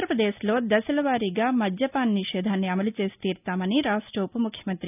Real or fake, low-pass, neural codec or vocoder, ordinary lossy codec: real; 3.6 kHz; none; none